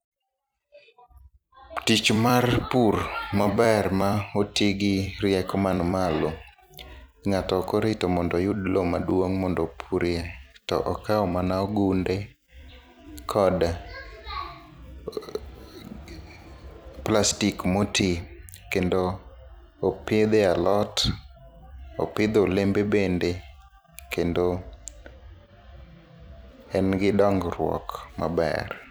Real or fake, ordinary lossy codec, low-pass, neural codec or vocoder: fake; none; none; vocoder, 44.1 kHz, 128 mel bands every 256 samples, BigVGAN v2